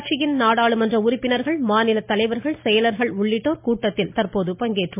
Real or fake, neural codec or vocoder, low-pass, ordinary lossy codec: real; none; 3.6 kHz; MP3, 32 kbps